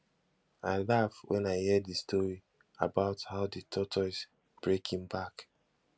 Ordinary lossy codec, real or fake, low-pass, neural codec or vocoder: none; real; none; none